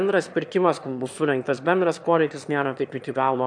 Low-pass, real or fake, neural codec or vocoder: 9.9 kHz; fake; autoencoder, 22.05 kHz, a latent of 192 numbers a frame, VITS, trained on one speaker